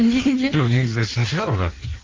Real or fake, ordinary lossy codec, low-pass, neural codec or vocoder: fake; Opus, 32 kbps; 7.2 kHz; codec, 16 kHz in and 24 kHz out, 1.1 kbps, FireRedTTS-2 codec